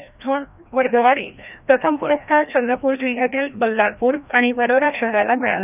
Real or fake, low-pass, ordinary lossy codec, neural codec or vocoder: fake; 3.6 kHz; none; codec, 16 kHz, 1 kbps, FreqCodec, larger model